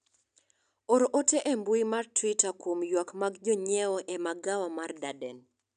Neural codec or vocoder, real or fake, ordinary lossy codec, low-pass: none; real; none; 9.9 kHz